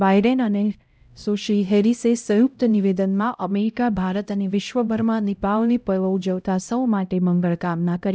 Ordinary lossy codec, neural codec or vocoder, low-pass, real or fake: none; codec, 16 kHz, 0.5 kbps, X-Codec, HuBERT features, trained on LibriSpeech; none; fake